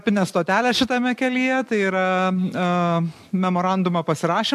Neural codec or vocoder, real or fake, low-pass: none; real; 14.4 kHz